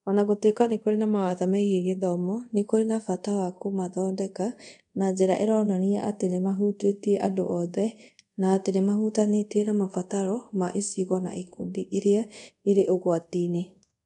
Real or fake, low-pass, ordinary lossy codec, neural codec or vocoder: fake; 10.8 kHz; none; codec, 24 kHz, 0.5 kbps, DualCodec